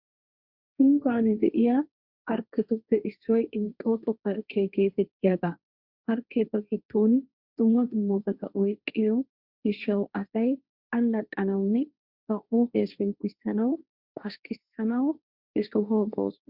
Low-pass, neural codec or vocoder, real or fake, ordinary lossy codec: 5.4 kHz; codec, 16 kHz, 1.1 kbps, Voila-Tokenizer; fake; Opus, 64 kbps